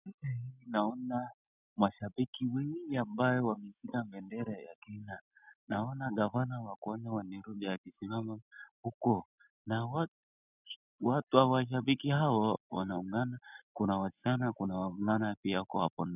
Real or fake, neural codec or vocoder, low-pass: real; none; 3.6 kHz